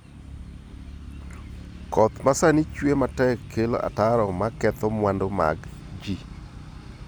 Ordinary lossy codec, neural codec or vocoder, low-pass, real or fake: none; none; none; real